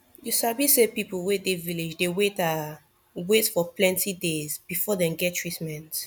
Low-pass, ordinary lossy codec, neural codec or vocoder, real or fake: 19.8 kHz; none; none; real